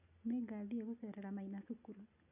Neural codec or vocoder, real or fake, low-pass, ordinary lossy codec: none; real; 3.6 kHz; none